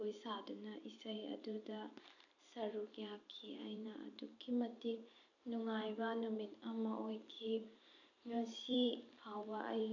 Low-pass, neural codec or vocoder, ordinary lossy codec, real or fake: 7.2 kHz; vocoder, 44.1 kHz, 128 mel bands every 512 samples, BigVGAN v2; none; fake